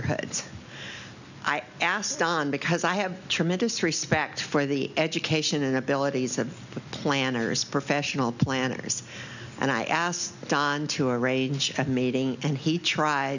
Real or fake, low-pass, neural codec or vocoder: real; 7.2 kHz; none